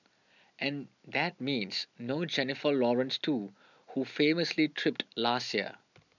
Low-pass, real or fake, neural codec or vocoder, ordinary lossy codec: 7.2 kHz; real; none; none